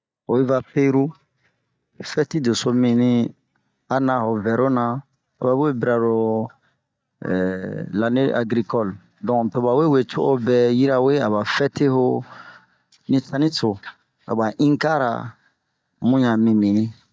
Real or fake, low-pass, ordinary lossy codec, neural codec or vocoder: real; none; none; none